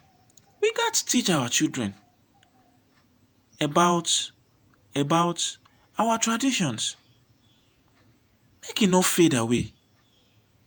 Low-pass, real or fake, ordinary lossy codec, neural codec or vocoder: none; fake; none; vocoder, 48 kHz, 128 mel bands, Vocos